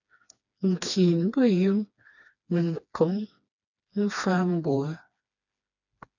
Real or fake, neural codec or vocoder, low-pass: fake; codec, 16 kHz, 2 kbps, FreqCodec, smaller model; 7.2 kHz